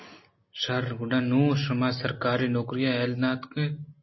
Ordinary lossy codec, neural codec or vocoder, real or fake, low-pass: MP3, 24 kbps; none; real; 7.2 kHz